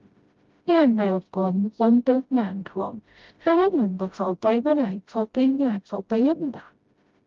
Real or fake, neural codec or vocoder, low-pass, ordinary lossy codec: fake; codec, 16 kHz, 0.5 kbps, FreqCodec, smaller model; 7.2 kHz; Opus, 32 kbps